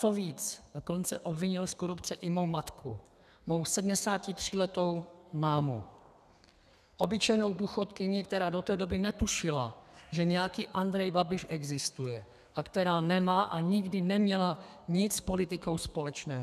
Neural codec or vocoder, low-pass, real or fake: codec, 32 kHz, 1.9 kbps, SNAC; 14.4 kHz; fake